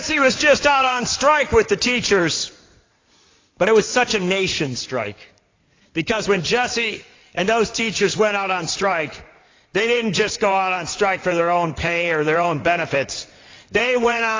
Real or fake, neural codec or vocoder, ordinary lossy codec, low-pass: fake; codec, 16 kHz in and 24 kHz out, 2.2 kbps, FireRedTTS-2 codec; AAC, 32 kbps; 7.2 kHz